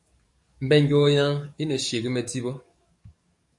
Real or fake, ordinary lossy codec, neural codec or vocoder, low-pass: fake; MP3, 48 kbps; codec, 44.1 kHz, 7.8 kbps, DAC; 10.8 kHz